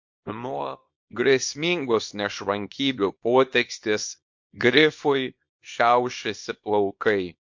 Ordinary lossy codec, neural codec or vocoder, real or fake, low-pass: MP3, 48 kbps; codec, 24 kHz, 0.9 kbps, WavTokenizer, small release; fake; 7.2 kHz